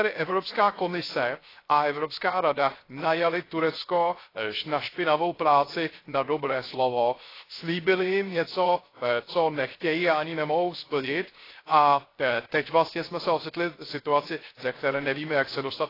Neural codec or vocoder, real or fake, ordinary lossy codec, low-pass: codec, 16 kHz, 0.3 kbps, FocalCodec; fake; AAC, 24 kbps; 5.4 kHz